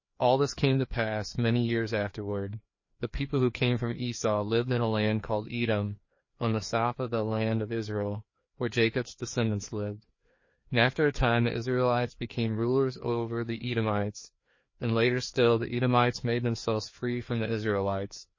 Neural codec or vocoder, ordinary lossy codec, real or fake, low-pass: codec, 16 kHz, 2 kbps, FreqCodec, larger model; MP3, 32 kbps; fake; 7.2 kHz